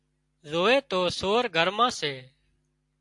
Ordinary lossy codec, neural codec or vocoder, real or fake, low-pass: AAC, 64 kbps; none; real; 10.8 kHz